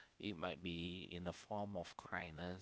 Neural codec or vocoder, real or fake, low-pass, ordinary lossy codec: codec, 16 kHz, 0.8 kbps, ZipCodec; fake; none; none